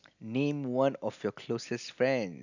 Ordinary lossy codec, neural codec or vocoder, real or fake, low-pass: none; none; real; 7.2 kHz